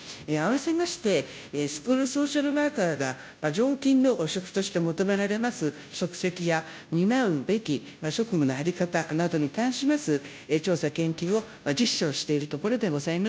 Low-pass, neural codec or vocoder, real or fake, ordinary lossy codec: none; codec, 16 kHz, 0.5 kbps, FunCodec, trained on Chinese and English, 25 frames a second; fake; none